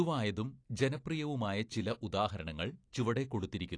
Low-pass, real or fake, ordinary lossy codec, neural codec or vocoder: 9.9 kHz; real; AAC, 48 kbps; none